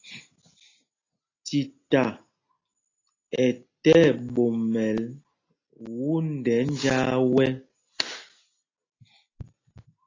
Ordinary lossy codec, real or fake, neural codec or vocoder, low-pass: AAC, 32 kbps; real; none; 7.2 kHz